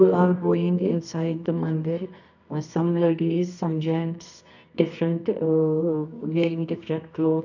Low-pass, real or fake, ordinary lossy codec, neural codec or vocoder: 7.2 kHz; fake; none; codec, 24 kHz, 0.9 kbps, WavTokenizer, medium music audio release